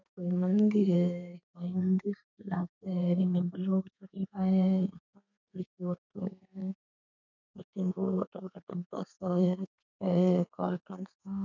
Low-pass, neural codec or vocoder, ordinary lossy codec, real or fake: 7.2 kHz; codec, 32 kHz, 1.9 kbps, SNAC; none; fake